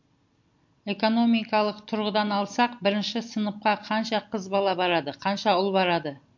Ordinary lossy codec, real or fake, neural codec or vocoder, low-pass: MP3, 48 kbps; real; none; 7.2 kHz